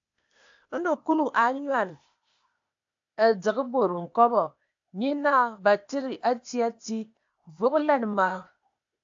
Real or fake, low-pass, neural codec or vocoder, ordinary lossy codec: fake; 7.2 kHz; codec, 16 kHz, 0.8 kbps, ZipCodec; AAC, 64 kbps